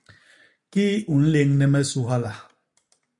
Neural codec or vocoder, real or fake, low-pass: none; real; 10.8 kHz